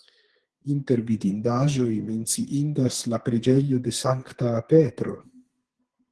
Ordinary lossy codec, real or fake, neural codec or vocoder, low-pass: Opus, 16 kbps; fake; codec, 32 kHz, 1.9 kbps, SNAC; 10.8 kHz